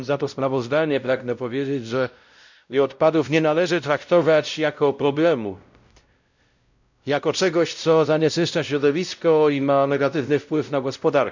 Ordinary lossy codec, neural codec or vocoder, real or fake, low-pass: none; codec, 16 kHz, 0.5 kbps, X-Codec, WavLM features, trained on Multilingual LibriSpeech; fake; 7.2 kHz